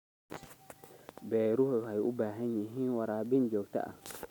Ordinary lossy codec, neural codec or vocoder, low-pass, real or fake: none; none; none; real